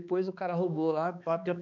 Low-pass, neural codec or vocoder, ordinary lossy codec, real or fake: 7.2 kHz; codec, 16 kHz, 2 kbps, X-Codec, HuBERT features, trained on balanced general audio; none; fake